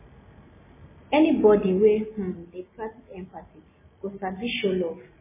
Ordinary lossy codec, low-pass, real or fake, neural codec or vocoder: MP3, 16 kbps; 3.6 kHz; real; none